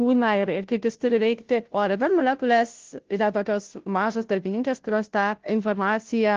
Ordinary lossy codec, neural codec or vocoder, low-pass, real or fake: Opus, 16 kbps; codec, 16 kHz, 0.5 kbps, FunCodec, trained on Chinese and English, 25 frames a second; 7.2 kHz; fake